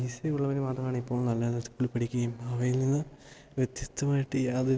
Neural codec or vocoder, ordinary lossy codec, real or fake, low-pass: none; none; real; none